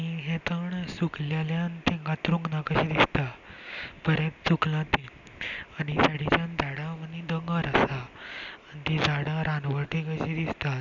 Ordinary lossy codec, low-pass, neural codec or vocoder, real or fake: none; 7.2 kHz; none; real